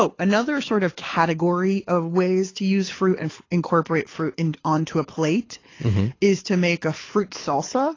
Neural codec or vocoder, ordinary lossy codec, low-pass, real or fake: codec, 24 kHz, 6 kbps, HILCodec; AAC, 32 kbps; 7.2 kHz; fake